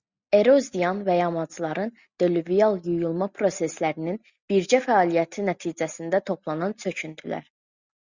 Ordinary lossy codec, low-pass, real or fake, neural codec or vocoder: Opus, 64 kbps; 7.2 kHz; real; none